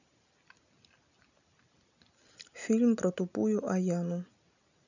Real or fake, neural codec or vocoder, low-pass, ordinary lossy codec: real; none; 7.2 kHz; none